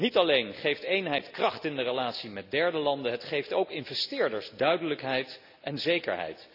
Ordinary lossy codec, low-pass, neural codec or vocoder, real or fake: none; 5.4 kHz; none; real